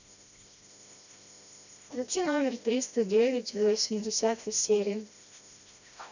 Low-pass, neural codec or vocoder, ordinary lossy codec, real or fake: 7.2 kHz; codec, 16 kHz, 1 kbps, FreqCodec, smaller model; none; fake